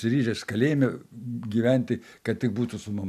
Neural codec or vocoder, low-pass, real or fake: none; 14.4 kHz; real